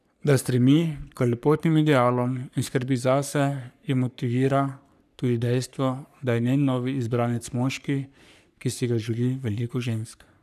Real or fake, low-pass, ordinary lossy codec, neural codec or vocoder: fake; 14.4 kHz; none; codec, 44.1 kHz, 3.4 kbps, Pupu-Codec